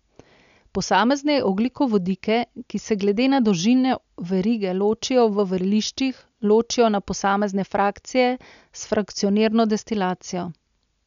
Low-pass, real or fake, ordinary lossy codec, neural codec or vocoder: 7.2 kHz; real; none; none